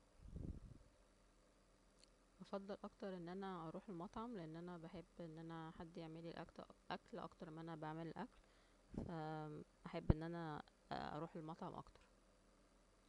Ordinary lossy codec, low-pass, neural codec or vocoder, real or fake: none; 10.8 kHz; none; real